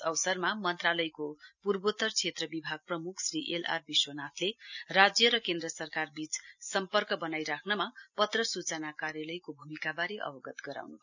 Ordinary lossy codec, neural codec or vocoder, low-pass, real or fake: none; none; 7.2 kHz; real